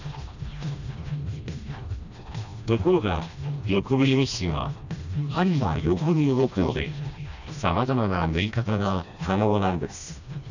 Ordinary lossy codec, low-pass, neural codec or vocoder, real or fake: none; 7.2 kHz; codec, 16 kHz, 1 kbps, FreqCodec, smaller model; fake